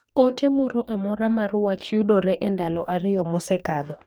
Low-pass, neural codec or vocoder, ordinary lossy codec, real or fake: none; codec, 44.1 kHz, 2.6 kbps, DAC; none; fake